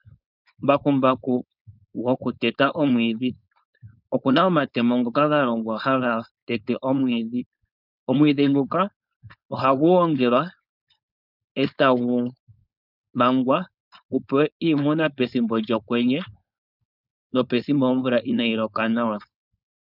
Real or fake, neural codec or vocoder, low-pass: fake; codec, 16 kHz, 4.8 kbps, FACodec; 5.4 kHz